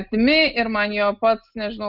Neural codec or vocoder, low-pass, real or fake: none; 5.4 kHz; real